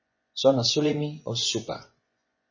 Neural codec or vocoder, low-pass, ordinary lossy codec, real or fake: vocoder, 24 kHz, 100 mel bands, Vocos; 7.2 kHz; MP3, 32 kbps; fake